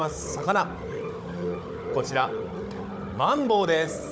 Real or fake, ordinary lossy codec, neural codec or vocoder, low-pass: fake; none; codec, 16 kHz, 16 kbps, FunCodec, trained on Chinese and English, 50 frames a second; none